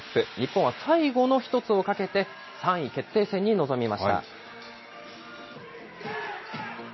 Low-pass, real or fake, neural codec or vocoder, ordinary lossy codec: 7.2 kHz; real; none; MP3, 24 kbps